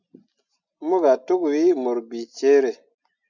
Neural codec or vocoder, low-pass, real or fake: none; 7.2 kHz; real